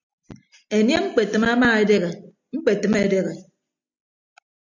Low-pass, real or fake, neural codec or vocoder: 7.2 kHz; real; none